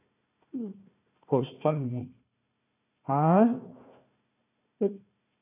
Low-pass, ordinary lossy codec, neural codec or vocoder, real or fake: 3.6 kHz; none; codec, 16 kHz, 1 kbps, FunCodec, trained on Chinese and English, 50 frames a second; fake